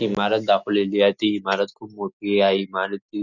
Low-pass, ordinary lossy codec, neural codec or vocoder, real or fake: 7.2 kHz; none; none; real